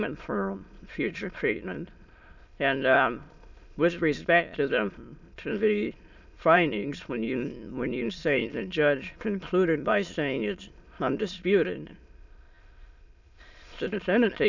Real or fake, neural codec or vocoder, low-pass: fake; autoencoder, 22.05 kHz, a latent of 192 numbers a frame, VITS, trained on many speakers; 7.2 kHz